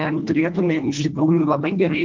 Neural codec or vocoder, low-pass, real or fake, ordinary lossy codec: codec, 24 kHz, 1.5 kbps, HILCodec; 7.2 kHz; fake; Opus, 24 kbps